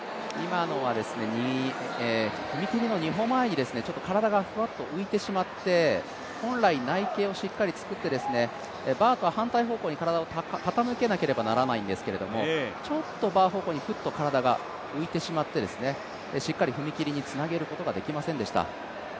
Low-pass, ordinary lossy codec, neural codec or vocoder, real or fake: none; none; none; real